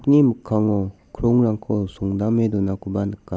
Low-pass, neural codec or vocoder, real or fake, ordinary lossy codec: none; none; real; none